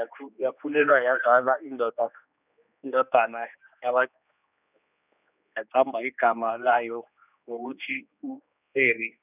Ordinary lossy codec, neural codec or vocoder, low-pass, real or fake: none; codec, 16 kHz, 2 kbps, X-Codec, HuBERT features, trained on general audio; 3.6 kHz; fake